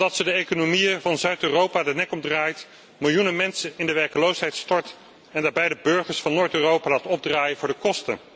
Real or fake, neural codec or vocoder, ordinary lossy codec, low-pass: real; none; none; none